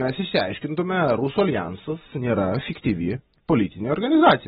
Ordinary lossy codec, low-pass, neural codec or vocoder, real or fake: AAC, 16 kbps; 7.2 kHz; none; real